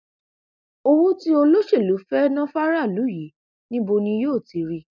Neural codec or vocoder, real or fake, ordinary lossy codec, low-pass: none; real; none; 7.2 kHz